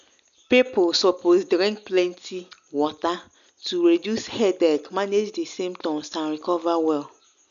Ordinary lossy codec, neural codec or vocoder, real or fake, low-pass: none; none; real; 7.2 kHz